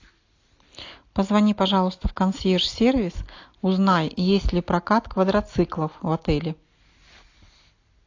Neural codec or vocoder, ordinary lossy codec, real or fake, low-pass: none; AAC, 48 kbps; real; 7.2 kHz